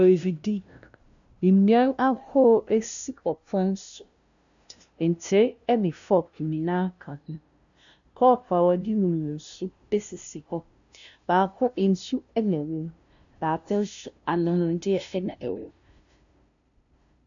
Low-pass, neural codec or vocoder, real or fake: 7.2 kHz; codec, 16 kHz, 0.5 kbps, FunCodec, trained on LibriTTS, 25 frames a second; fake